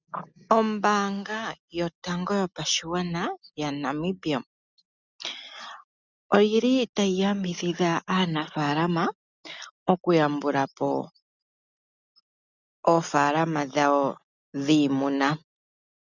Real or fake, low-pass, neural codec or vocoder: real; 7.2 kHz; none